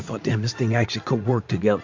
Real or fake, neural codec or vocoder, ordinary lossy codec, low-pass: fake; vocoder, 44.1 kHz, 80 mel bands, Vocos; MP3, 48 kbps; 7.2 kHz